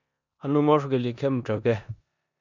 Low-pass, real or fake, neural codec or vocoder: 7.2 kHz; fake; codec, 16 kHz in and 24 kHz out, 0.9 kbps, LongCat-Audio-Codec, four codebook decoder